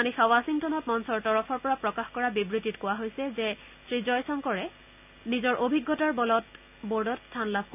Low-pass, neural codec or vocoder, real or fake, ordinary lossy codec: 3.6 kHz; none; real; none